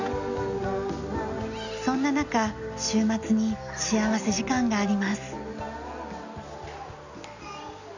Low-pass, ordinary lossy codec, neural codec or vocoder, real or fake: 7.2 kHz; none; none; real